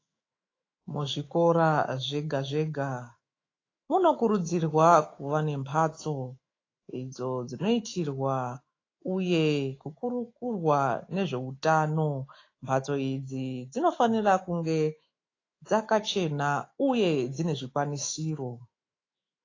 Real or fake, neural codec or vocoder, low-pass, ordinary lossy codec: fake; autoencoder, 48 kHz, 128 numbers a frame, DAC-VAE, trained on Japanese speech; 7.2 kHz; AAC, 32 kbps